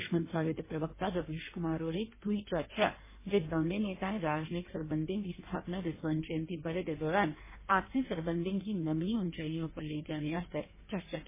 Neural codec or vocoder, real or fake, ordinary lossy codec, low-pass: codec, 16 kHz in and 24 kHz out, 1.1 kbps, FireRedTTS-2 codec; fake; MP3, 16 kbps; 3.6 kHz